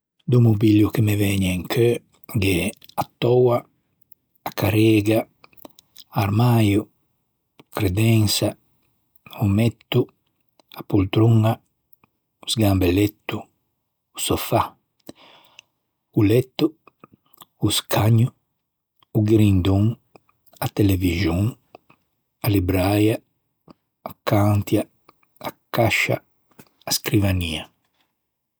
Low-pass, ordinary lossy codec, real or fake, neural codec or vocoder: none; none; real; none